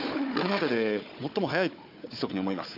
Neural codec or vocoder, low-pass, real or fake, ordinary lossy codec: codec, 16 kHz, 4 kbps, FunCodec, trained on Chinese and English, 50 frames a second; 5.4 kHz; fake; AAC, 48 kbps